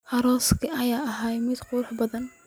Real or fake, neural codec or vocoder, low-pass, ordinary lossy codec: real; none; none; none